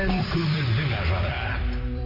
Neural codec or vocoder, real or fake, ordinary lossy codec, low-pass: vocoder, 44.1 kHz, 128 mel bands every 256 samples, BigVGAN v2; fake; AAC, 24 kbps; 5.4 kHz